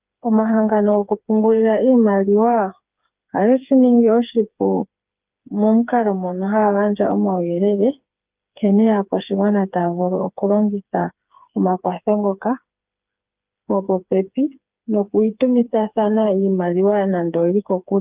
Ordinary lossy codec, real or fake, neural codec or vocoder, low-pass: Opus, 32 kbps; fake; codec, 16 kHz, 4 kbps, FreqCodec, smaller model; 3.6 kHz